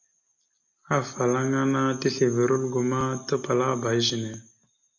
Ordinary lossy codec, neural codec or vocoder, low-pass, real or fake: MP3, 48 kbps; none; 7.2 kHz; real